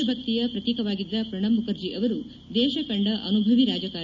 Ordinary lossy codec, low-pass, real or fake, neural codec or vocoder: none; 7.2 kHz; real; none